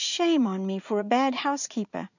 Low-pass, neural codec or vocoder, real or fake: 7.2 kHz; vocoder, 44.1 kHz, 80 mel bands, Vocos; fake